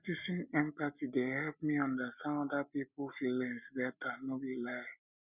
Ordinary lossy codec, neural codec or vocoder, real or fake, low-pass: none; none; real; 3.6 kHz